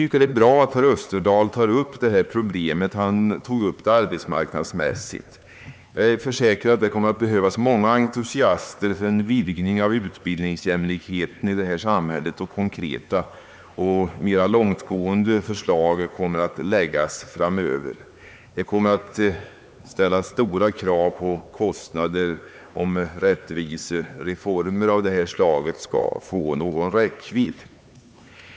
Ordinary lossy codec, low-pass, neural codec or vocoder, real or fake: none; none; codec, 16 kHz, 4 kbps, X-Codec, HuBERT features, trained on LibriSpeech; fake